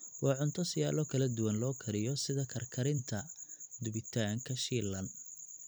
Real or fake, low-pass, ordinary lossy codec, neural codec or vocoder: fake; none; none; vocoder, 44.1 kHz, 128 mel bands every 256 samples, BigVGAN v2